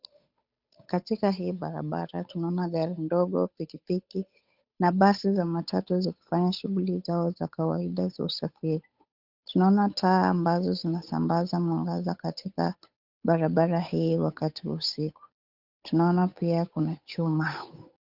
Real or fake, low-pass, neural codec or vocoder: fake; 5.4 kHz; codec, 16 kHz, 8 kbps, FunCodec, trained on Chinese and English, 25 frames a second